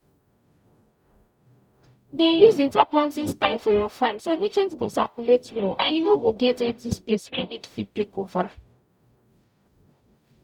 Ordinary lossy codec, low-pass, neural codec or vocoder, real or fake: none; 19.8 kHz; codec, 44.1 kHz, 0.9 kbps, DAC; fake